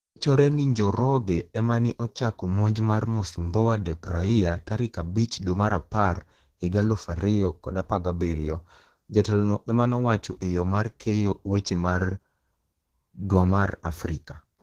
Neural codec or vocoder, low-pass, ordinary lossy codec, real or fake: codec, 32 kHz, 1.9 kbps, SNAC; 14.4 kHz; Opus, 16 kbps; fake